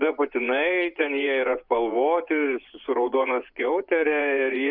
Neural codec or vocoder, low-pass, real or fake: vocoder, 24 kHz, 100 mel bands, Vocos; 5.4 kHz; fake